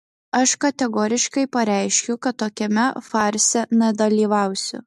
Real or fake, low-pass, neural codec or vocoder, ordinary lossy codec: real; 14.4 kHz; none; MP3, 64 kbps